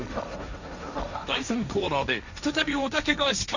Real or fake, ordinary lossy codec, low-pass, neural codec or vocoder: fake; none; 7.2 kHz; codec, 16 kHz, 1.1 kbps, Voila-Tokenizer